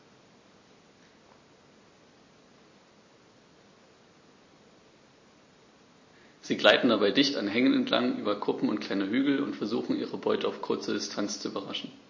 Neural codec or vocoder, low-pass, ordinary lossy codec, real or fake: none; 7.2 kHz; MP3, 32 kbps; real